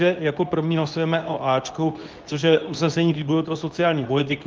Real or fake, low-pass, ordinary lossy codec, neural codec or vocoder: fake; 7.2 kHz; Opus, 24 kbps; codec, 24 kHz, 0.9 kbps, WavTokenizer, medium speech release version 2